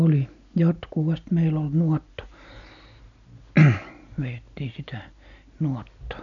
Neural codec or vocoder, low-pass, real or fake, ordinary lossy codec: none; 7.2 kHz; real; none